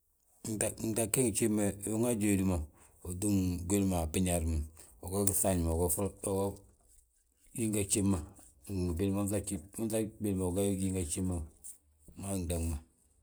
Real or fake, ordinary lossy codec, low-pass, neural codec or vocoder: real; none; none; none